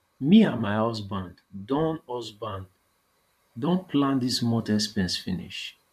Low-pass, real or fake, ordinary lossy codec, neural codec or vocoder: 14.4 kHz; fake; none; vocoder, 44.1 kHz, 128 mel bands, Pupu-Vocoder